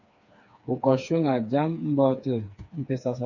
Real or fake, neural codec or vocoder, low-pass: fake; codec, 16 kHz, 4 kbps, FreqCodec, smaller model; 7.2 kHz